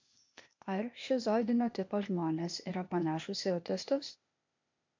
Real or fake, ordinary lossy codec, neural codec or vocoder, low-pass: fake; MP3, 48 kbps; codec, 16 kHz, 0.8 kbps, ZipCodec; 7.2 kHz